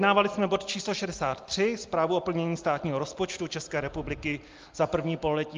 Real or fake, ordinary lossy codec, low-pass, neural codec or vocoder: real; Opus, 32 kbps; 7.2 kHz; none